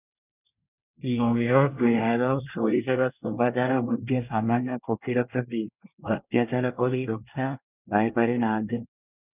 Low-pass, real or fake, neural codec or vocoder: 3.6 kHz; fake; codec, 24 kHz, 1 kbps, SNAC